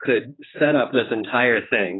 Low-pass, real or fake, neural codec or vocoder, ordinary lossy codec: 7.2 kHz; fake; codec, 16 kHz, 2 kbps, X-Codec, HuBERT features, trained on general audio; AAC, 16 kbps